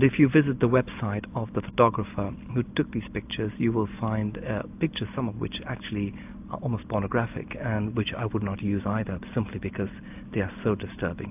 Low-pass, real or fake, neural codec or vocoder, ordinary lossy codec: 3.6 kHz; real; none; AAC, 32 kbps